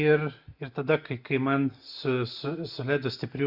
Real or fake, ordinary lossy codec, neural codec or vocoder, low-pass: real; Opus, 64 kbps; none; 5.4 kHz